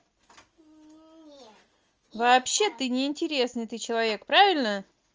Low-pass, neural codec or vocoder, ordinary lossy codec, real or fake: 7.2 kHz; none; Opus, 24 kbps; real